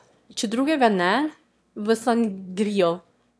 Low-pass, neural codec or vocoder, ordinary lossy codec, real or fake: none; autoencoder, 22.05 kHz, a latent of 192 numbers a frame, VITS, trained on one speaker; none; fake